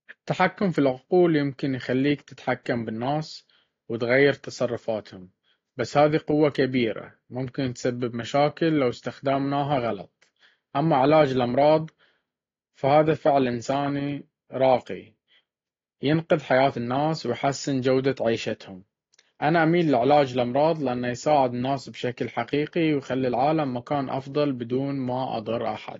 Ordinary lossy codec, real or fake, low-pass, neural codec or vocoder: AAC, 32 kbps; real; 7.2 kHz; none